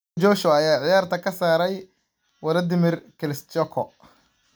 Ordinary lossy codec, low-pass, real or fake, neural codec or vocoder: none; none; real; none